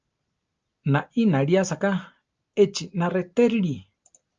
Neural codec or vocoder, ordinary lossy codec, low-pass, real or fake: none; Opus, 24 kbps; 7.2 kHz; real